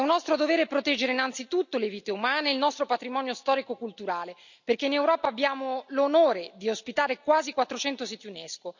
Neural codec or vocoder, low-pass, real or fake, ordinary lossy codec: none; 7.2 kHz; real; none